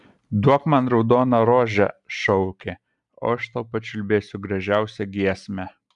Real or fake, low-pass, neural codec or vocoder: real; 10.8 kHz; none